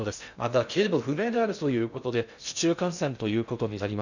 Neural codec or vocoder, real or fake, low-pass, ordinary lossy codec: codec, 16 kHz in and 24 kHz out, 0.6 kbps, FocalCodec, streaming, 2048 codes; fake; 7.2 kHz; none